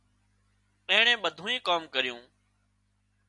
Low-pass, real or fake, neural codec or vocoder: 10.8 kHz; real; none